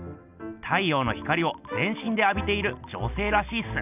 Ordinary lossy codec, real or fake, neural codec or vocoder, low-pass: none; real; none; 3.6 kHz